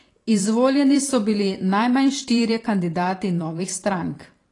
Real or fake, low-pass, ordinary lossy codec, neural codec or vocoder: fake; 10.8 kHz; AAC, 32 kbps; vocoder, 44.1 kHz, 128 mel bands every 512 samples, BigVGAN v2